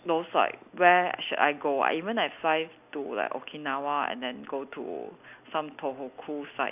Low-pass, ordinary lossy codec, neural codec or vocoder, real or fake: 3.6 kHz; none; none; real